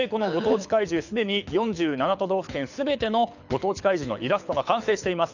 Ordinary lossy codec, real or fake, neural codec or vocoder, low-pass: none; fake; codec, 24 kHz, 6 kbps, HILCodec; 7.2 kHz